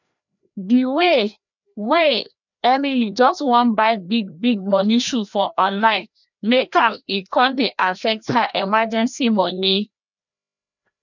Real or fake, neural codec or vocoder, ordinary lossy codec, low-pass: fake; codec, 16 kHz, 1 kbps, FreqCodec, larger model; none; 7.2 kHz